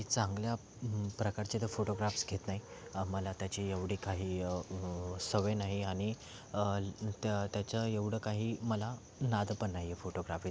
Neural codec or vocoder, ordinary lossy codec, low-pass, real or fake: none; none; none; real